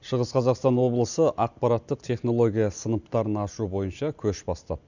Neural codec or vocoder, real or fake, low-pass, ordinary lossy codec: none; real; 7.2 kHz; none